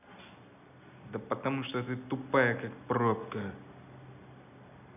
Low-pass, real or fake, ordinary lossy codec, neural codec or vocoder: 3.6 kHz; real; none; none